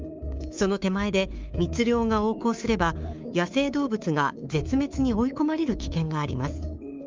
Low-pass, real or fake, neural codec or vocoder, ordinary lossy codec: 7.2 kHz; fake; codec, 24 kHz, 3.1 kbps, DualCodec; Opus, 32 kbps